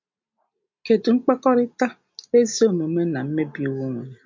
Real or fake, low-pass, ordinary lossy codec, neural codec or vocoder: real; 7.2 kHz; MP3, 48 kbps; none